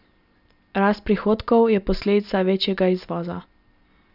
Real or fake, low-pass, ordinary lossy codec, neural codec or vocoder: real; 5.4 kHz; none; none